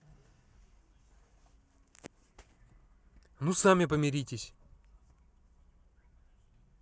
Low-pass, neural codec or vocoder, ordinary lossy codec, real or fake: none; none; none; real